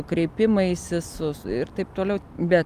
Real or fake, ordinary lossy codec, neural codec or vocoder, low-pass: real; Opus, 32 kbps; none; 14.4 kHz